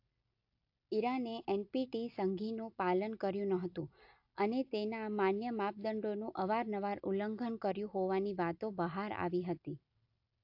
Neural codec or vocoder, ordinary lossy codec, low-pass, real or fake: none; AAC, 48 kbps; 5.4 kHz; real